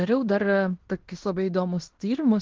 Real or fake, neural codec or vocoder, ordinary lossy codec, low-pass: fake; codec, 16 kHz in and 24 kHz out, 0.9 kbps, LongCat-Audio-Codec, fine tuned four codebook decoder; Opus, 16 kbps; 7.2 kHz